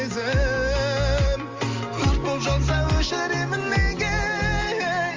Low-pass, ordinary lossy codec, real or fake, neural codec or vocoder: 7.2 kHz; Opus, 32 kbps; real; none